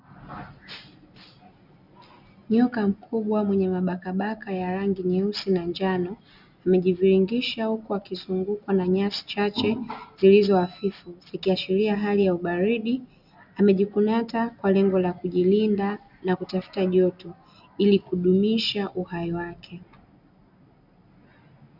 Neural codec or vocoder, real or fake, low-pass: none; real; 5.4 kHz